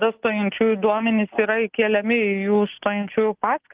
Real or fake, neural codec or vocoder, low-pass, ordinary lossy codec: real; none; 3.6 kHz; Opus, 24 kbps